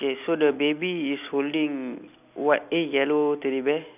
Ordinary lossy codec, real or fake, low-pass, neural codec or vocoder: none; real; 3.6 kHz; none